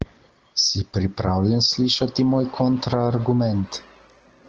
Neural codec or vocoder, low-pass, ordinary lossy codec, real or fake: none; 7.2 kHz; Opus, 16 kbps; real